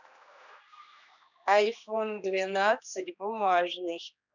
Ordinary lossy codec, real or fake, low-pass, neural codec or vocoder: none; fake; 7.2 kHz; codec, 16 kHz, 2 kbps, X-Codec, HuBERT features, trained on general audio